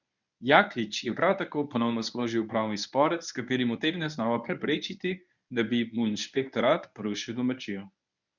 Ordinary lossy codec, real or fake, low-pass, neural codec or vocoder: none; fake; 7.2 kHz; codec, 24 kHz, 0.9 kbps, WavTokenizer, medium speech release version 1